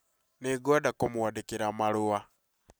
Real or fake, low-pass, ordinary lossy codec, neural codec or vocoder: real; none; none; none